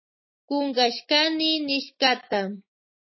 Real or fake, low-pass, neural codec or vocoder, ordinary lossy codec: fake; 7.2 kHz; autoencoder, 48 kHz, 128 numbers a frame, DAC-VAE, trained on Japanese speech; MP3, 24 kbps